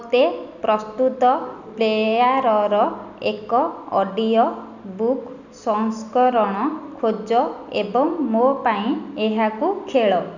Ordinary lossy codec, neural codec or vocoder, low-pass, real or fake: none; none; 7.2 kHz; real